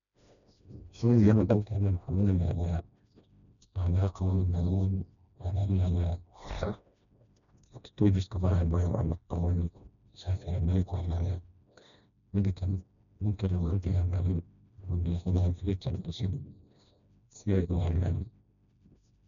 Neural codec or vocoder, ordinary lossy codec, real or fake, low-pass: codec, 16 kHz, 1 kbps, FreqCodec, smaller model; none; fake; 7.2 kHz